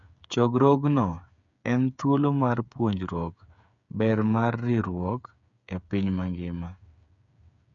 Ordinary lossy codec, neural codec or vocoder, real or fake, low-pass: none; codec, 16 kHz, 8 kbps, FreqCodec, smaller model; fake; 7.2 kHz